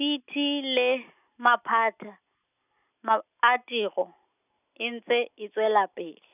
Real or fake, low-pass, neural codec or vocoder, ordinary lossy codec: real; 3.6 kHz; none; none